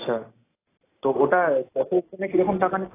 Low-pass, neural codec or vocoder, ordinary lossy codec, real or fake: 3.6 kHz; none; AAC, 16 kbps; real